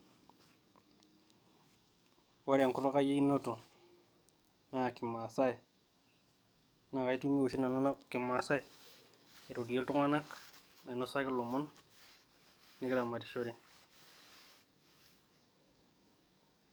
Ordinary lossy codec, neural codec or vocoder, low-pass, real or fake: none; codec, 44.1 kHz, 7.8 kbps, DAC; none; fake